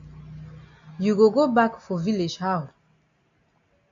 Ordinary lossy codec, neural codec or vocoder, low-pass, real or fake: AAC, 64 kbps; none; 7.2 kHz; real